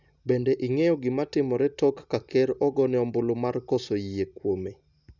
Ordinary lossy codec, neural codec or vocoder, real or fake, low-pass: none; none; real; 7.2 kHz